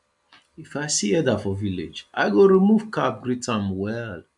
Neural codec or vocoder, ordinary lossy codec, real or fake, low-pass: none; MP3, 64 kbps; real; 10.8 kHz